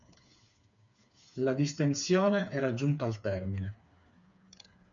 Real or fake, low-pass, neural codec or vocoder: fake; 7.2 kHz; codec, 16 kHz, 4 kbps, FreqCodec, smaller model